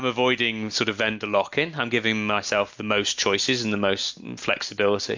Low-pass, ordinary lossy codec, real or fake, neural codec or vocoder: 7.2 kHz; MP3, 64 kbps; real; none